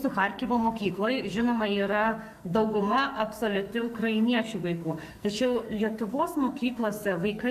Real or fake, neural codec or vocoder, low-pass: fake; codec, 44.1 kHz, 2.6 kbps, SNAC; 14.4 kHz